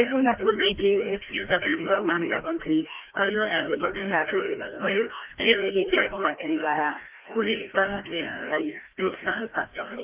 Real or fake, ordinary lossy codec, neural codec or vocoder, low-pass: fake; Opus, 24 kbps; codec, 16 kHz, 1 kbps, FreqCodec, larger model; 3.6 kHz